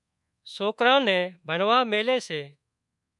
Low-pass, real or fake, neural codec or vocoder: 10.8 kHz; fake; codec, 24 kHz, 1.2 kbps, DualCodec